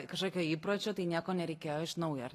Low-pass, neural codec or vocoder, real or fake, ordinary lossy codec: 14.4 kHz; none; real; AAC, 48 kbps